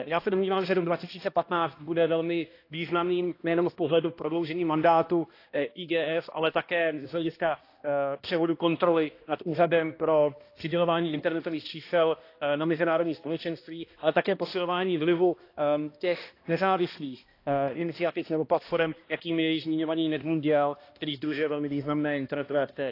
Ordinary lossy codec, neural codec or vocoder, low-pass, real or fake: AAC, 32 kbps; codec, 16 kHz, 1 kbps, X-Codec, HuBERT features, trained on balanced general audio; 5.4 kHz; fake